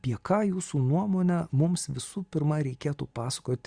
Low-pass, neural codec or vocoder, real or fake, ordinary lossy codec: 9.9 kHz; none; real; Opus, 64 kbps